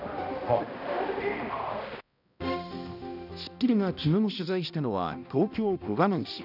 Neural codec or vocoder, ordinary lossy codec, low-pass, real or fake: codec, 16 kHz, 1 kbps, X-Codec, HuBERT features, trained on balanced general audio; none; 5.4 kHz; fake